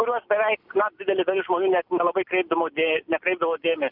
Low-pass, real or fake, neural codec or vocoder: 5.4 kHz; real; none